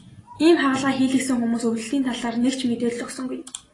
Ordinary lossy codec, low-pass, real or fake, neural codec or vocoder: AAC, 32 kbps; 10.8 kHz; real; none